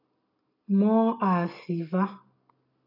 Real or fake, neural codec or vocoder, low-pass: real; none; 5.4 kHz